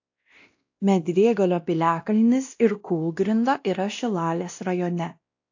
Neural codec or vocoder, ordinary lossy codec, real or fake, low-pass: codec, 16 kHz, 1 kbps, X-Codec, WavLM features, trained on Multilingual LibriSpeech; AAC, 48 kbps; fake; 7.2 kHz